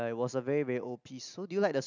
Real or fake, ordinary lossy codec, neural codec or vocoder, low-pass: real; none; none; 7.2 kHz